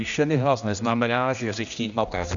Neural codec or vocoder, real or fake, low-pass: codec, 16 kHz, 1 kbps, X-Codec, HuBERT features, trained on general audio; fake; 7.2 kHz